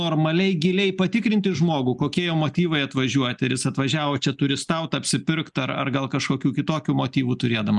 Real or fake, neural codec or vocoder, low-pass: real; none; 10.8 kHz